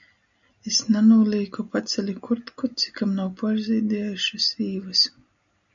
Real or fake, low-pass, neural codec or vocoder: real; 7.2 kHz; none